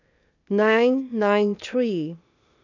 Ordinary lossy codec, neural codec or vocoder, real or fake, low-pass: none; codec, 16 kHz, 0.8 kbps, ZipCodec; fake; 7.2 kHz